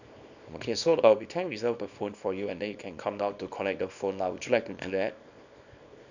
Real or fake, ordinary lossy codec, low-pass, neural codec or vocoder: fake; none; 7.2 kHz; codec, 24 kHz, 0.9 kbps, WavTokenizer, small release